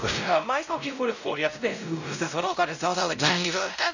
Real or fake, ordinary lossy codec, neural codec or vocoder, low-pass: fake; none; codec, 16 kHz, 0.5 kbps, X-Codec, WavLM features, trained on Multilingual LibriSpeech; 7.2 kHz